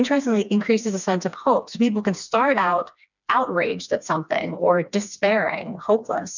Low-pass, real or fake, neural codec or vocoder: 7.2 kHz; fake; codec, 16 kHz, 2 kbps, FreqCodec, smaller model